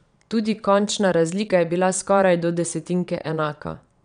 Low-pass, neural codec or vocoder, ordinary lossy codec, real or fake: 9.9 kHz; vocoder, 22.05 kHz, 80 mel bands, Vocos; none; fake